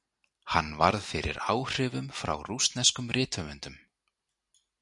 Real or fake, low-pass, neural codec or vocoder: real; 10.8 kHz; none